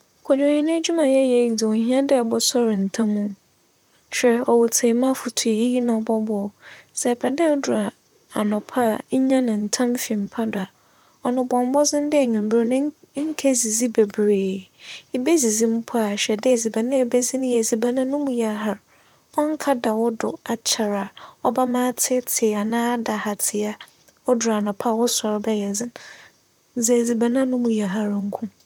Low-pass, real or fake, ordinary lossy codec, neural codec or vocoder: 19.8 kHz; fake; none; vocoder, 44.1 kHz, 128 mel bands, Pupu-Vocoder